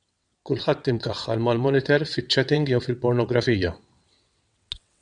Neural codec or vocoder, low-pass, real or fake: vocoder, 22.05 kHz, 80 mel bands, WaveNeXt; 9.9 kHz; fake